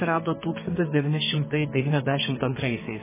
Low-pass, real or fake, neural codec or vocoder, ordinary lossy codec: 3.6 kHz; fake; codec, 32 kHz, 1.9 kbps, SNAC; MP3, 16 kbps